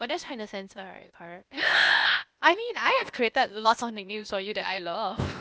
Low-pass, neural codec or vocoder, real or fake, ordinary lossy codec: none; codec, 16 kHz, 0.8 kbps, ZipCodec; fake; none